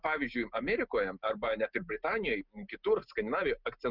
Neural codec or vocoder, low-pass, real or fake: none; 5.4 kHz; real